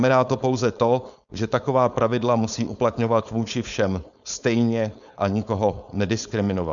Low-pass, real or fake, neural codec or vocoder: 7.2 kHz; fake; codec, 16 kHz, 4.8 kbps, FACodec